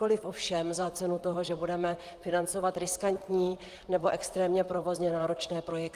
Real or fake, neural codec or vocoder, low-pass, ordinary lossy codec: fake; vocoder, 44.1 kHz, 128 mel bands, Pupu-Vocoder; 14.4 kHz; Opus, 32 kbps